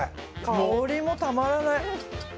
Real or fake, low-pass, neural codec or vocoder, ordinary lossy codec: real; none; none; none